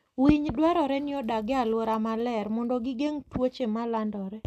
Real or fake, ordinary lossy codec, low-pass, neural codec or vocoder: real; none; 14.4 kHz; none